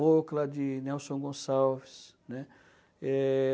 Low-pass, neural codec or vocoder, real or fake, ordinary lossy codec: none; none; real; none